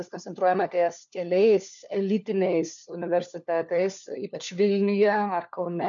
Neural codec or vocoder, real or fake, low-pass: codec, 16 kHz, 4 kbps, FunCodec, trained on LibriTTS, 50 frames a second; fake; 7.2 kHz